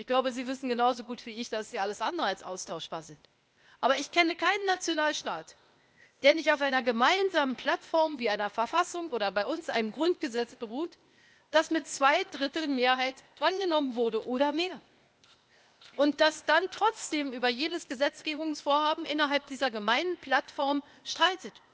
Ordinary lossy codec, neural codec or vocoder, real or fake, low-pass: none; codec, 16 kHz, 0.8 kbps, ZipCodec; fake; none